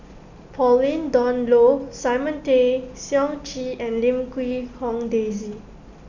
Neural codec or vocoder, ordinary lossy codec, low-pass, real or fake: none; none; 7.2 kHz; real